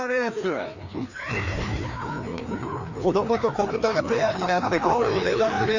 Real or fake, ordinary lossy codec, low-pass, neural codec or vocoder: fake; none; 7.2 kHz; codec, 16 kHz, 2 kbps, FreqCodec, larger model